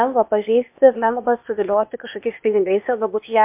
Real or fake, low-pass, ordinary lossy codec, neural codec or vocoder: fake; 3.6 kHz; MP3, 32 kbps; codec, 16 kHz, 0.8 kbps, ZipCodec